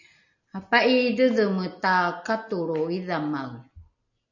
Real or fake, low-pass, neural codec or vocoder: real; 7.2 kHz; none